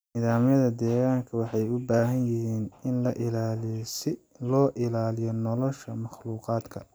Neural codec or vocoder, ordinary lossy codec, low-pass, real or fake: none; none; none; real